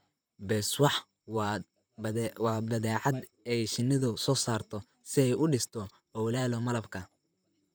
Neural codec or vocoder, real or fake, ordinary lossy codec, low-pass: vocoder, 44.1 kHz, 128 mel bands, Pupu-Vocoder; fake; none; none